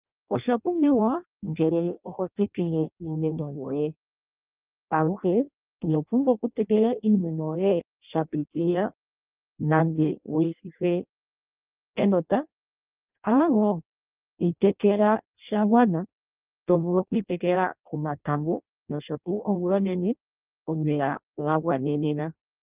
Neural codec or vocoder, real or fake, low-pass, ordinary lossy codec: codec, 16 kHz in and 24 kHz out, 0.6 kbps, FireRedTTS-2 codec; fake; 3.6 kHz; Opus, 24 kbps